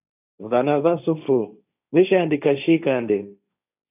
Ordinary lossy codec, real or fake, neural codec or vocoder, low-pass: AAC, 32 kbps; fake; codec, 16 kHz, 1.1 kbps, Voila-Tokenizer; 3.6 kHz